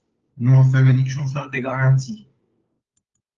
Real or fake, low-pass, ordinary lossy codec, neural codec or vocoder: fake; 7.2 kHz; Opus, 32 kbps; codec, 16 kHz, 4 kbps, FunCodec, trained on LibriTTS, 50 frames a second